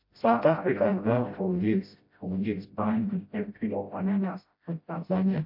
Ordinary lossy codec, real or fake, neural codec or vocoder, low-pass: MP3, 32 kbps; fake; codec, 16 kHz, 0.5 kbps, FreqCodec, smaller model; 5.4 kHz